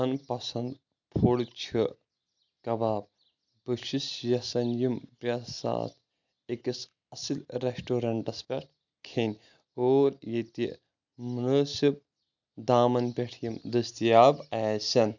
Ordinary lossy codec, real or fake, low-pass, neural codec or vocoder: none; real; 7.2 kHz; none